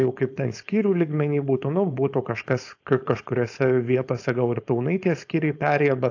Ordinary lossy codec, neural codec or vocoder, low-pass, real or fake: AAC, 48 kbps; codec, 16 kHz, 4.8 kbps, FACodec; 7.2 kHz; fake